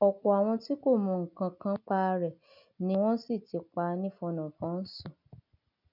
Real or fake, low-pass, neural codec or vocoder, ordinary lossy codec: real; 5.4 kHz; none; none